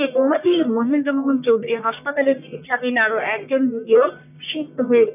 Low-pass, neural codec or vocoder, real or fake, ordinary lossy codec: 3.6 kHz; codec, 44.1 kHz, 1.7 kbps, Pupu-Codec; fake; none